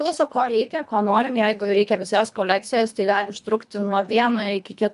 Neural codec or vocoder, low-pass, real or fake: codec, 24 kHz, 1.5 kbps, HILCodec; 10.8 kHz; fake